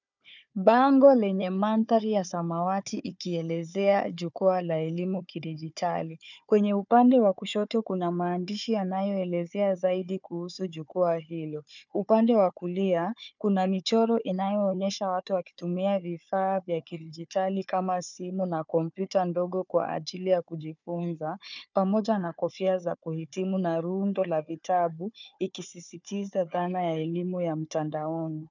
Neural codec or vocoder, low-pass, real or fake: codec, 16 kHz, 4 kbps, FunCodec, trained on Chinese and English, 50 frames a second; 7.2 kHz; fake